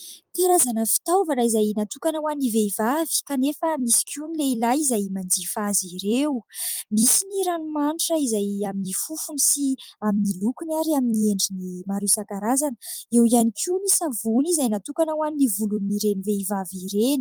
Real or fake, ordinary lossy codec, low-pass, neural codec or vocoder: fake; Opus, 32 kbps; 19.8 kHz; vocoder, 44.1 kHz, 128 mel bands every 256 samples, BigVGAN v2